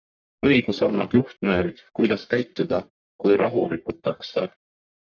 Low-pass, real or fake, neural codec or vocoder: 7.2 kHz; fake; codec, 44.1 kHz, 1.7 kbps, Pupu-Codec